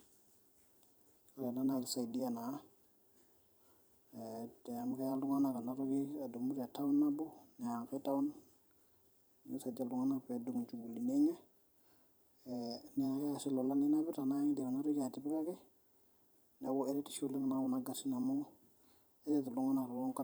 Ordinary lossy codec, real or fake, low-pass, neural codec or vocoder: none; fake; none; vocoder, 44.1 kHz, 128 mel bands every 512 samples, BigVGAN v2